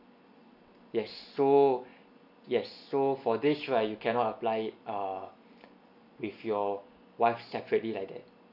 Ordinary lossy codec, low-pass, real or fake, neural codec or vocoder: none; 5.4 kHz; real; none